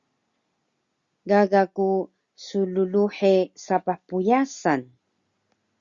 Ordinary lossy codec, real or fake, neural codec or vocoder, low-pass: Opus, 64 kbps; real; none; 7.2 kHz